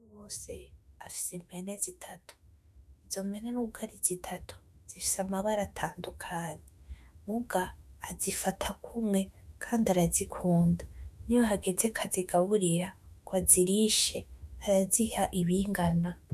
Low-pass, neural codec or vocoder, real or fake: 14.4 kHz; autoencoder, 48 kHz, 32 numbers a frame, DAC-VAE, trained on Japanese speech; fake